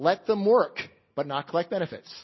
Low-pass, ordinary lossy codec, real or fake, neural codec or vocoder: 7.2 kHz; MP3, 24 kbps; real; none